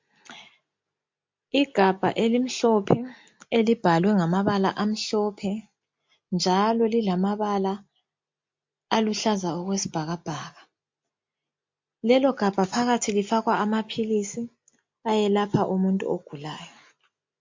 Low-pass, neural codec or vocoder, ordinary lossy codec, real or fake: 7.2 kHz; none; MP3, 48 kbps; real